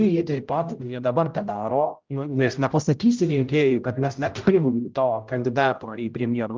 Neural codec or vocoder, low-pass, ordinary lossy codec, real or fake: codec, 16 kHz, 0.5 kbps, X-Codec, HuBERT features, trained on general audio; 7.2 kHz; Opus, 24 kbps; fake